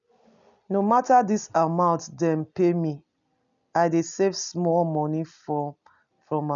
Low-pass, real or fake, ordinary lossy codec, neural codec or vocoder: 7.2 kHz; real; none; none